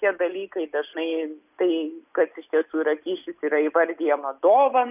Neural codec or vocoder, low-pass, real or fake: none; 3.6 kHz; real